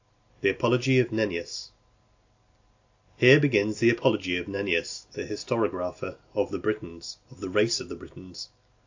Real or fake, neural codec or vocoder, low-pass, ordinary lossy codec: real; none; 7.2 kHz; AAC, 48 kbps